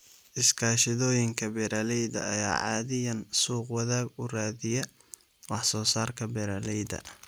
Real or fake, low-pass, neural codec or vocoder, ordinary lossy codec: real; none; none; none